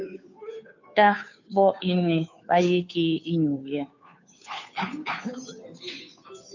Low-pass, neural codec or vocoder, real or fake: 7.2 kHz; codec, 16 kHz, 2 kbps, FunCodec, trained on Chinese and English, 25 frames a second; fake